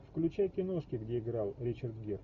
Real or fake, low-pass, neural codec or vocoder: real; 7.2 kHz; none